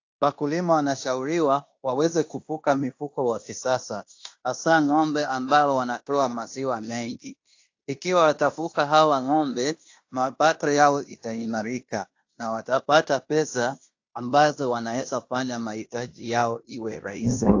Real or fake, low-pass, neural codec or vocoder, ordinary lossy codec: fake; 7.2 kHz; codec, 16 kHz in and 24 kHz out, 0.9 kbps, LongCat-Audio-Codec, fine tuned four codebook decoder; AAC, 48 kbps